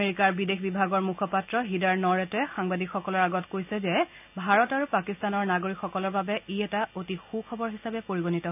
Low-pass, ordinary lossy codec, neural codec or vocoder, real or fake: 3.6 kHz; none; none; real